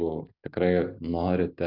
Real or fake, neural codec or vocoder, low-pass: real; none; 5.4 kHz